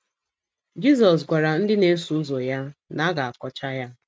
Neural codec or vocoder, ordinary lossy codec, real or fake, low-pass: none; none; real; none